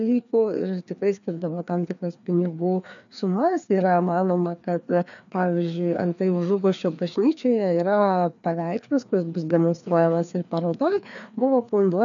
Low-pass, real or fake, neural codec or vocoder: 7.2 kHz; fake; codec, 16 kHz, 2 kbps, FreqCodec, larger model